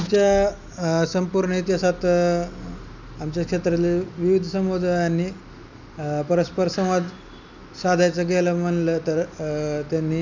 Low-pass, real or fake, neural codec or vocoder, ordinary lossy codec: 7.2 kHz; real; none; none